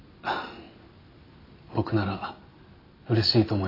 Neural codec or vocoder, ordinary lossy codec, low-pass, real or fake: none; AAC, 24 kbps; 5.4 kHz; real